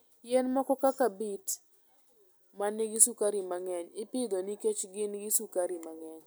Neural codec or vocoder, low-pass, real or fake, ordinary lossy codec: none; none; real; none